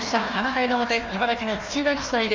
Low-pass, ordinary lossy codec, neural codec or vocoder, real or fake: 7.2 kHz; Opus, 32 kbps; codec, 16 kHz, 1 kbps, FunCodec, trained on Chinese and English, 50 frames a second; fake